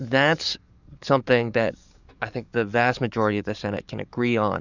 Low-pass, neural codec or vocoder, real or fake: 7.2 kHz; codec, 44.1 kHz, 7.8 kbps, DAC; fake